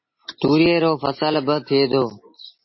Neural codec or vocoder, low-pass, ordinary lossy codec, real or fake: none; 7.2 kHz; MP3, 24 kbps; real